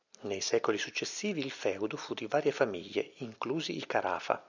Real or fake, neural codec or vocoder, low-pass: real; none; 7.2 kHz